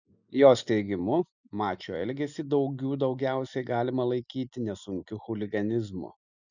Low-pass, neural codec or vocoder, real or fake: 7.2 kHz; none; real